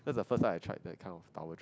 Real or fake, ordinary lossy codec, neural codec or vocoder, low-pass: real; none; none; none